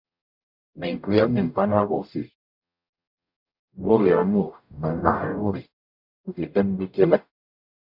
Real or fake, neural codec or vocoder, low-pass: fake; codec, 44.1 kHz, 0.9 kbps, DAC; 5.4 kHz